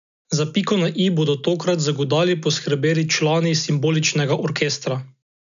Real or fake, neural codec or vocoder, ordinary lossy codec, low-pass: real; none; none; 7.2 kHz